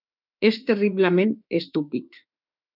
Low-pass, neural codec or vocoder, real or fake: 5.4 kHz; autoencoder, 48 kHz, 32 numbers a frame, DAC-VAE, trained on Japanese speech; fake